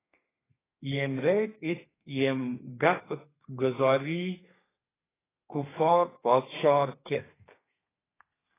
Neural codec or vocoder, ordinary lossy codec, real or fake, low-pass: codec, 32 kHz, 1.9 kbps, SNAC; AAC, 16 kbps; fake; 3.6 kHz